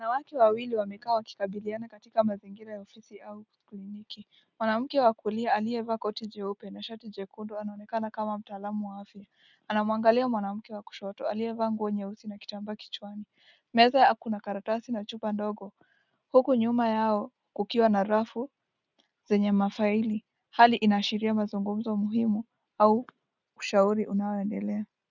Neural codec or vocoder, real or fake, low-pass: none; real; 7.2 kHz